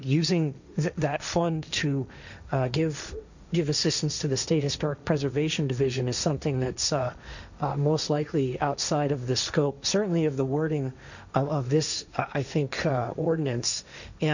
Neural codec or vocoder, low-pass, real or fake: codec, 16 kHz, 1.1 kbps, Voila-Tokenizer; 7.2 kHz; fake